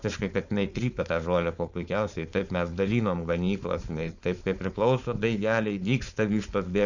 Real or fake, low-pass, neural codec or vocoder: fake; 7.2 kHz; codec, 16 kHz, 4.8 kbps, FACodec